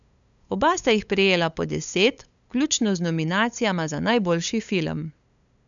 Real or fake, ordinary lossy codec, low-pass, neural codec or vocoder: fake; none; 7.2 kHz; codec, 16 kHz, 8 kbps, FunCodec, trained on LibriTTS, 25 frames a second